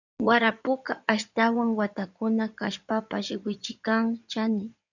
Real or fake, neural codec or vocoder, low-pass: fake; codec, 16 kHz in and 24 kHz out, 2.2 kbps, FireRedTTS-2 codec; 7.2 kHz